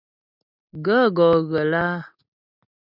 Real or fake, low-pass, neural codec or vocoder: real; 5.4 kHz; none